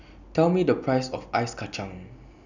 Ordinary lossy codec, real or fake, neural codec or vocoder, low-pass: none; real; none; 7.2 kHz